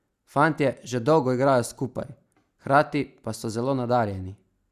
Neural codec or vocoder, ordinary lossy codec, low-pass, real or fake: none; Opus, 64 kbps; 14.4 kHz; real